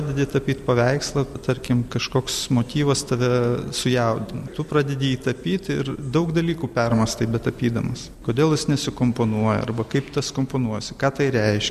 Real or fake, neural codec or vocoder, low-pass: real; none; 14.4 kHz